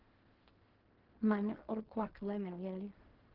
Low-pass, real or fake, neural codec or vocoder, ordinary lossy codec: 5.4 kHz; fake; codec, 16 kHz in and 24 kHz out, 0.4 kbps, LongCat-Audio-Codec, fine tuned four codebook decoder; Opus, 16 kbps